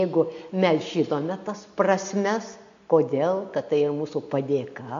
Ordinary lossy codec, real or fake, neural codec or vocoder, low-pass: AAC, 64 kbps; real; none; 7.2 kHz